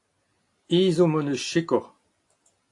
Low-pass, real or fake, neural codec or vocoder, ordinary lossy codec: 10.8 kHz; real; none; AAC, 48 kbps